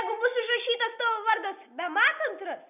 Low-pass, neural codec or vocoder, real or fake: 3.6 kHz; none; real